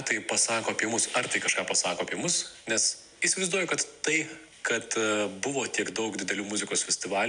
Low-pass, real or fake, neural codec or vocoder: 9.9 kHz; real; none